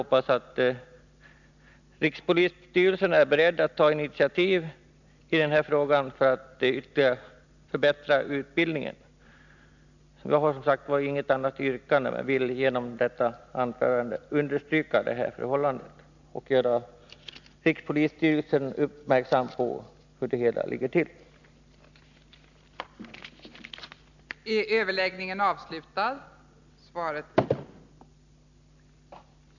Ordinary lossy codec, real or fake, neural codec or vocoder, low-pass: none; real; none; 7.2 kHz